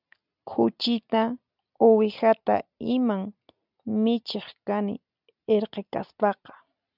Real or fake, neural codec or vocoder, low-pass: real; none; 5.4 kHz